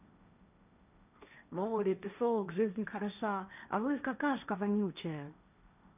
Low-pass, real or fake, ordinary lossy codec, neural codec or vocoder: 3.6 kHz; fake; none; codec, 16 kHz, 1.1 kbps, Voila-Tokenizer